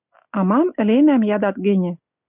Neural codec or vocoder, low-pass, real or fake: none; 3.6 kHz; real